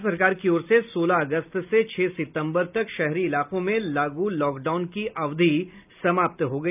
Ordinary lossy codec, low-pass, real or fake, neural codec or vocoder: none; 3.6 kHz; real; none